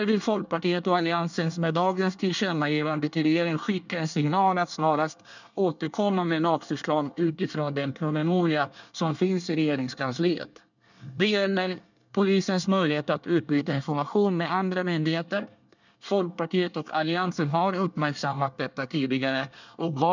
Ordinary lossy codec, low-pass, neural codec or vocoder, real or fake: none; 7.2 kHz; codec, 24 kHz, 1 kbps, SNAC; fake